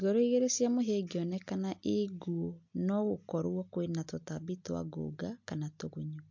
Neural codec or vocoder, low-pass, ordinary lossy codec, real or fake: none; 7.2 kHz; MP3, 48 kbps; real